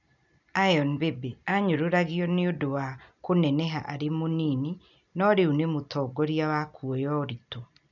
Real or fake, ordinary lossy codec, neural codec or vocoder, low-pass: real; none; none; 7.2 kHz